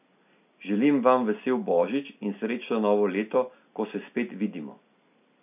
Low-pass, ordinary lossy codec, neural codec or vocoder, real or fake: 3.6 kHz; none; none; real